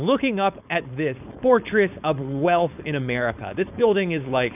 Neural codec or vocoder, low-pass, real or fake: codec, 16 kHz, 4.8 kbps, FACodec; 3.6 kHz; fake